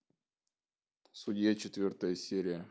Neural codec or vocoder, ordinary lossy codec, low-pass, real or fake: none; none; none; real